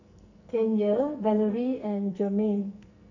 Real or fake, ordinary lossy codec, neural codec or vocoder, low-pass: fake; none; codec, 44.1 kHz, 2.6 kbps, SNAC; 7.2 kHz